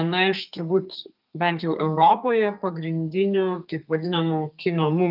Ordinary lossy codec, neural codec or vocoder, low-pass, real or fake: Opus, 24 kbps; codec, 32 kHz, 1.9 kbps, SNAC; 5.4 kHz; fake